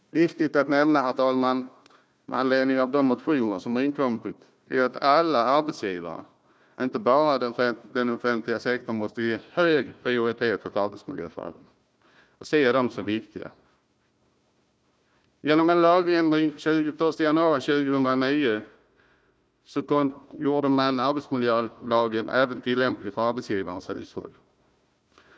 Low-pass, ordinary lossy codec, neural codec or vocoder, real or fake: none; none; codec, 16 kHz, 1 kbps, FunCodec, trained on Chinese and English, 50 frames a second; fake